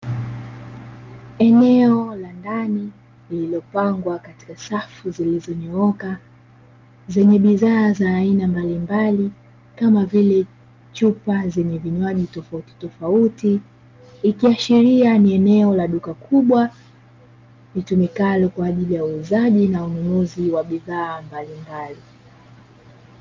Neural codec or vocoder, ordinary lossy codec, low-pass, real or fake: none; Opus, 24 kbps; 7.2 kHz; real